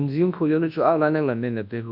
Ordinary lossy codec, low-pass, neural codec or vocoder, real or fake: none; 5.4 kHz; codec, 24 kHz, 0.9 kbps, WavTokenizer, large speech release; fake